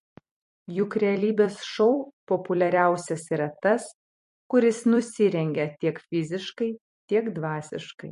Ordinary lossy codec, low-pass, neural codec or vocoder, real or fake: MP3, 48 kbps; 14.4 kHz; vocoder, 44.1 kHz, 128 mel bands every 256 samples, BigVGAN v2; fake